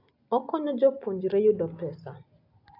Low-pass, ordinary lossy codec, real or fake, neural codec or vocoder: 5.4 kHz; AAC, 48 kbps; real; none